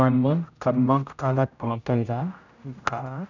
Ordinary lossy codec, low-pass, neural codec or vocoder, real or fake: none; 7.2 kHz; codec, 16 kHz, 0.5 kbps, X-Codec, HuBERT features, trained on general audio; fake